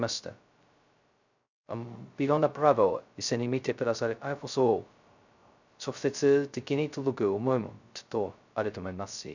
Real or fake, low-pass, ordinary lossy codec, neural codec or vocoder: fake; 7.2 kHz; none; codec, 16 kHz, 0.2 kbps, FocalCodec